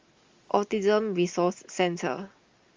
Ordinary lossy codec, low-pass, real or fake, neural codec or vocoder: Opus, 32 kbps; 7.2 kHz; real; none